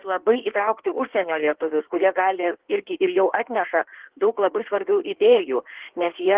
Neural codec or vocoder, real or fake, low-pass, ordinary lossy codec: codec, 16 kHz in and 24 kHz out, 1.1 kbps, FireRedTTS-2 codec; fake; 3.6 kHz; Opus, 16 kbps